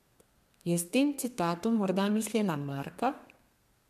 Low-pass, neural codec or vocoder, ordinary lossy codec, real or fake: 14.4 kHz; codec, 32 kHz, 1.9 kbps, SNAC; MP3, 96 kbps; fake